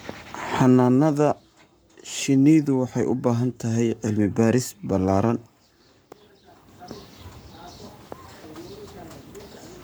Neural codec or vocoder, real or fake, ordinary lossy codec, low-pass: codec, 44.1 kHz, 7.8 kbps, Pupu-Codec; fake; none; none